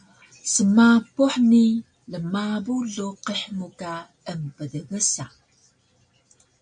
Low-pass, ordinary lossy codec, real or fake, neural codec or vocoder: 9.9 kHz; MP3, 48 kbps; real; none